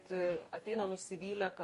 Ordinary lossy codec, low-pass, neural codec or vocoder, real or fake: MP3, 48 kbps; 14.4 kHz; codec, 44.1 kHz, 2.6 kbps, DAC; fake